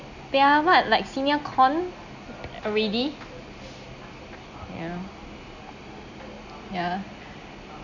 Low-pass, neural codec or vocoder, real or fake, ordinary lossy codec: 7.2 kHz; none; real; none